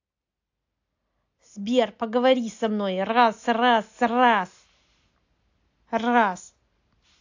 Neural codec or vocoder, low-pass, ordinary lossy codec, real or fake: none; 7.2 kHz; AAC, 48 kbps; real